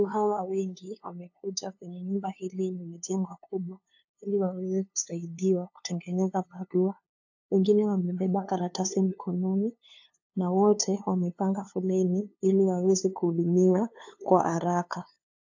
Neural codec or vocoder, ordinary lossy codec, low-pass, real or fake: codec, 16 kHz, 2 kbps, FunCodec, trained on LibriTTS, 25 frames a second; AAC, 48 kbps; 7.2 kHz; fake